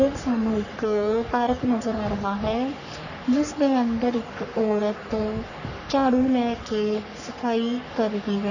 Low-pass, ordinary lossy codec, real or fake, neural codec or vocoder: 7.2 kHz; none; fake; codec, 44.1 kHz, 3.4 kbps, Pupu-Codec